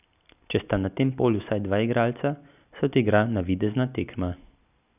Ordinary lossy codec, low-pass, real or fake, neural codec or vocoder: none; 3.6 kHz; real; none